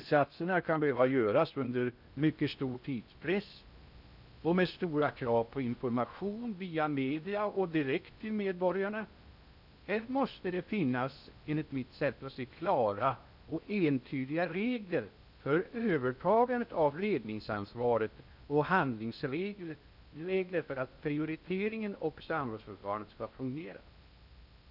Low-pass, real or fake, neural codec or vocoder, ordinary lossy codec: 5.4 kHz; fake; codec, 16 kHz in and 24 kHz out, 0.6 kbps, FocalCodec, streaming, 4096 codes; none